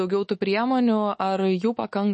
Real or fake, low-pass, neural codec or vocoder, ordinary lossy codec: fake; 10.8 kHz; autoencoder, 48 kHz, 128 numbers a frame, DAC-VAE, trained on Japanese speech; MP3, 32 kbps